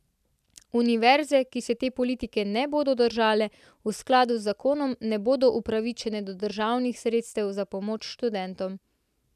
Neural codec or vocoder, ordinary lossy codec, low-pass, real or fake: none; none; 14.4 kHz; real